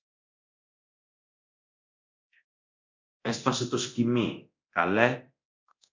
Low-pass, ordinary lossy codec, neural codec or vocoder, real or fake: 7.2 kHz; MP3, 64 kbps; codec, 24 kHz, 0.9 kbps, DualCodec; fake